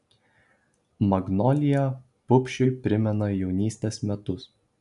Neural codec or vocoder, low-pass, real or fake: none; 10.8 kHz; real